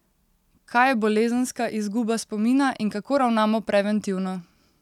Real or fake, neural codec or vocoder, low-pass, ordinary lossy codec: real; none; 19.8 kHz; none